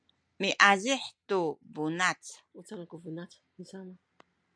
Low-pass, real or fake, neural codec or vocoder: 9.9 kHz; real; none